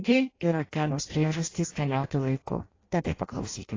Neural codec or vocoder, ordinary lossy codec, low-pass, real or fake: codec, 16 kHz in and 24 kHz out, 0.6 kbps, FireRedTTS-2 codec; AAC, 32 kbps; 7.2 kHz; fake